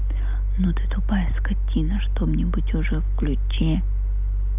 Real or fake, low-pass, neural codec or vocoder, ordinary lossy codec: real; 3.6 kHz; none; none